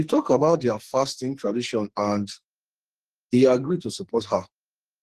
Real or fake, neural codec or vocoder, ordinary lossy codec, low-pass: fake; codec, 44.1 kHz, 2.6 kbps, SNAC; Opus, 16 kbps; 14.4 kHz